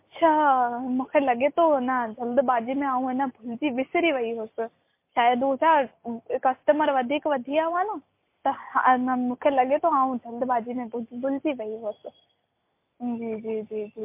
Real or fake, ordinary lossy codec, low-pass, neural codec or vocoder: real; MP3, 24 kbps; 3.6 kHz; none